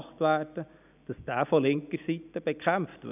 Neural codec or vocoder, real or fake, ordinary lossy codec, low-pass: none; real; none; 3.6 kHz